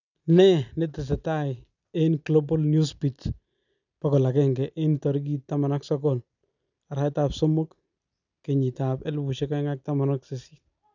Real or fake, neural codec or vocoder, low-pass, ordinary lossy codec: real; none; 7.2 kHz; none